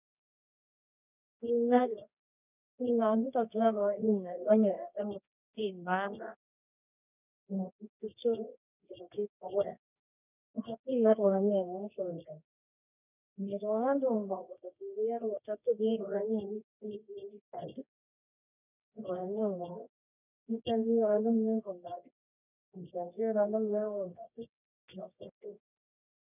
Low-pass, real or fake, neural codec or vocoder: 3.6 kHz; fake; codec, 24 kHz, 0.9 kbps, WavTokenizer, medium music audio release